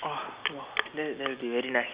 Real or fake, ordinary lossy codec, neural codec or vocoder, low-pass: real; Opus, 64 kbps; none; 3.6 kHz